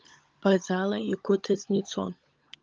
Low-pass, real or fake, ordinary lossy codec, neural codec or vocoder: 7.2 kHz; fake; Opus, 24 kbps; codec, 16 kHz, 16 kbps, FunCodec, trained on LibriTTS, 50 frames a second